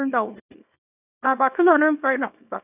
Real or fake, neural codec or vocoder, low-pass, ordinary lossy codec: fake; codec, 24 kHz, 0.9 kbps, WavTokenizer, small release; 3.6 kHz; none